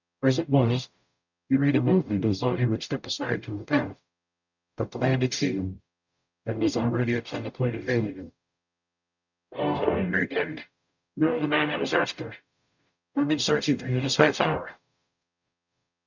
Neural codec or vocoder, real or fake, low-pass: codec, 44.1 kHz, 0.9 kbps, DAC; fake; 7.2 kHz